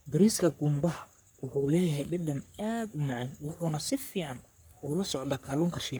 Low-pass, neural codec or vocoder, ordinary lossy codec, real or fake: none; codec, 44.1 kHz, 3.4 kbps, Pupu-Codec; none; fake